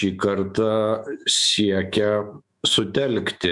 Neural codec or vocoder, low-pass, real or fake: autoencoder, 48 kHz, 128 numbers a frame, DAC-VAE, trained on Japanese speech; 10.8 kHz; fake